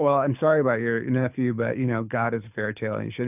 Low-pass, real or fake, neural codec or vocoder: 3.6 kHz; fake; codec, 24 kHz, 6 kbps, HILCodec